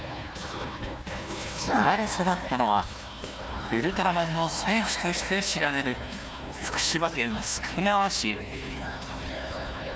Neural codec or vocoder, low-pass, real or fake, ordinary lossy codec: codec, 16 kHz, 1 kbps, FunCodec, trained on Chinese and English, 50 frames a second; none; fake; none